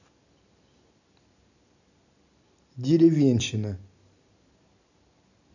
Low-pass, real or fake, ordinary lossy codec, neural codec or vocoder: 7.2 kHz; real; none; none